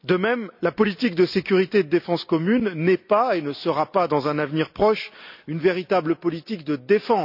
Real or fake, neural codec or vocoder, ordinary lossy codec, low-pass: real; none; none; 5.4 kHz